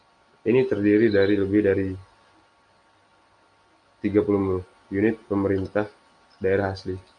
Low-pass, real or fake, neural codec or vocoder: 9.9 kHz; real; none